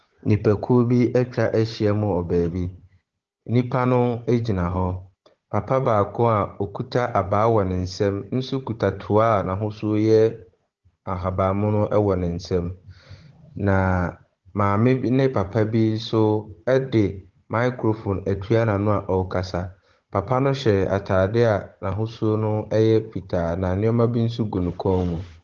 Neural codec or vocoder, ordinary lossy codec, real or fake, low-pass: codec, 16 kHz, 16 kbps, FunCodec, trained on Chinese and English, 50 frames a second; Opus, 16 kbps; fake; 7.2 kHz